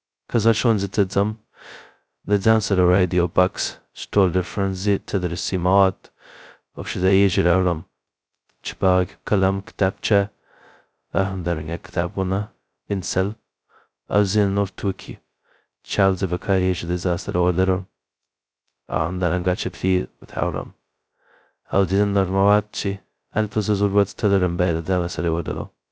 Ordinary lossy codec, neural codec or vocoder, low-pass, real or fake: none; codec, 16 kHz, 0.2 kbps, FocalCodec; none; fake